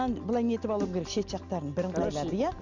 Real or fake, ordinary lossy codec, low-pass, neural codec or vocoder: real; none; 7.2 kHz; none